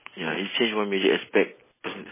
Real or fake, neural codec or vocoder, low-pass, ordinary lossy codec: real; none; 3.6 kHz; MP3, 16 kbps